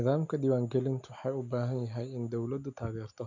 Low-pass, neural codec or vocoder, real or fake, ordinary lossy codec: 7.2 kHz; none; real; MP3, 64 kbps